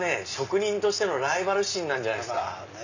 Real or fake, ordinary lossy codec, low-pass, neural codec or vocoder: real; none; 7.2 kHz; none